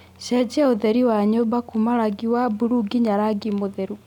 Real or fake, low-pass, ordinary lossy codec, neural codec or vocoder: real; 19.8 kHz; none; none